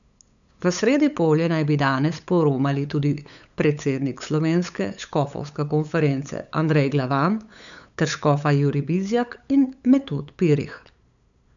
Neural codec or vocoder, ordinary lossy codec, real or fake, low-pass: codec, 16 kHz, 8 kbps, FunCodec, trained on LibriTTS, 25 frames a second; none; fake; 7.2 kHz